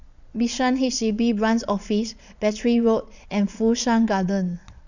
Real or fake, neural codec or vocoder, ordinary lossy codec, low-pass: fake; vocoder, 22.05 kHz, 80 mel bands, Vocos; none; 7.2 kHz